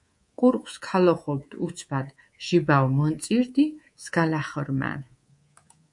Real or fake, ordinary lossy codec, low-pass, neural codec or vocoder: fake; MP3, 48 kbps; 10.8 kHz; codec, 24 kHz, 3.1 kbps, DualCodec